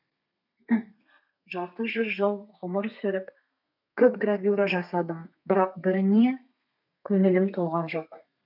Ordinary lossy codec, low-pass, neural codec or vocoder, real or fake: none; 5.4 kHz; codec, 32 kHz, 1.9 kbps, SNAC; fake